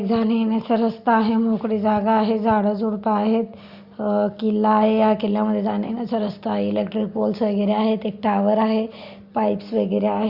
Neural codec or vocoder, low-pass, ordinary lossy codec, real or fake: none; 5.4 kHz; Opus, 64 kbps; real